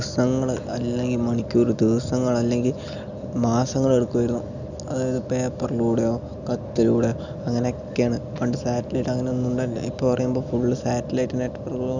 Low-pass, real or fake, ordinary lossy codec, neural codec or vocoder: 7.2 kHz; real; none; none